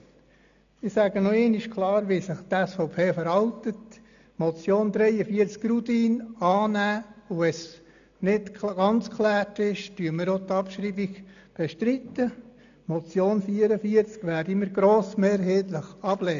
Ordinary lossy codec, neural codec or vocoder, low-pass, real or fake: none; none; 7.2 kHz; real